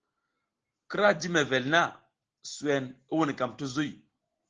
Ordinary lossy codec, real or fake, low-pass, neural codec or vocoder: Opus, 16 kbps; real; 7.2 kHz; none